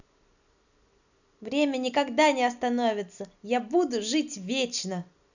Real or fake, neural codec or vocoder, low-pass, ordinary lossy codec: real; none; 7.2 kHz; none